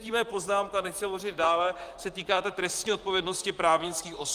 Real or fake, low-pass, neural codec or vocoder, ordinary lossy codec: fake; 14.4 kHz; vocoder, 44.1 kHz, 128 mel bands, Pupu-Vocoder; Opus, 32 kbps